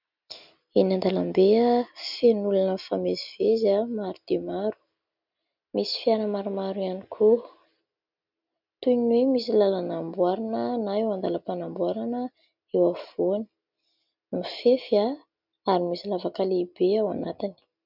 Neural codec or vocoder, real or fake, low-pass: none; real; 5.4 kHz